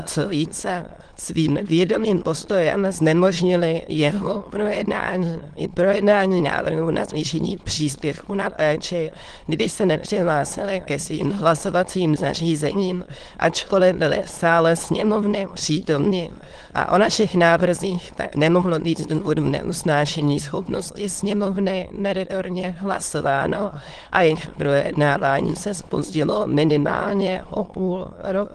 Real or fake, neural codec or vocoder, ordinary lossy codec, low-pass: fake; autoencoder, 22.05 kHz, a latent of 192 numbers a frame, VITS, trained on many speakers; Opus, 16 kbps; 9.9 kHz